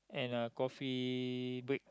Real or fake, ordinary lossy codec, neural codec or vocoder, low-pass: real; none; none; none